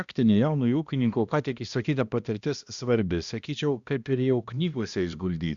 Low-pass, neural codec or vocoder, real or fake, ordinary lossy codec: 7.2 kHz; codec, 16 kHz, 1 kbps, X-Codec, HuBERT features, trained on balanced general audio; fake; Opus, 64 kbps